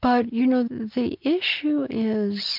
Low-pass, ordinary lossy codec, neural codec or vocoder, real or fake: 5.4 kHz; MP3, 48 kbps; none; real